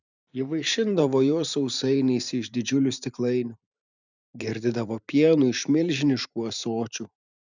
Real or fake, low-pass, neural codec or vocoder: fake; 7.2 kHz; vocoder, 44.1 kHz, 128 mel bands every 512 samples, BigVGAN v2